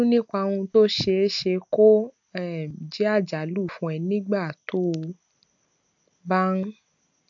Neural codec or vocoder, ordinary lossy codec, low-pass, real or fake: none; AAC, 64 kbps; 7.2 kHz; real